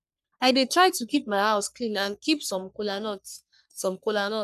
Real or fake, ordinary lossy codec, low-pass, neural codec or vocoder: fake; none; 14.4 kHz; codec, 44.1 kHz, 3.4 kbps, Pupu-Codec